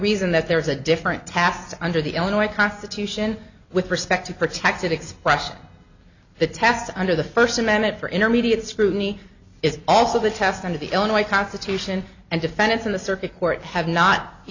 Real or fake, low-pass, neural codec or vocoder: real; 7.2 kHz; none